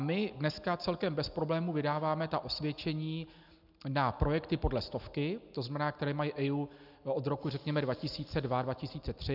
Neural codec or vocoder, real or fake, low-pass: none; real; 5.4 kHz